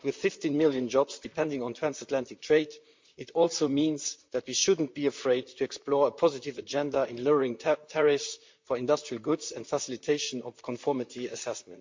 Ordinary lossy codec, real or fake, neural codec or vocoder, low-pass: MP3, 64 kbps; fake; vocoder, 44.1 kHz, 128 mel bands, Pupu-Vocoder; 7.2 kHz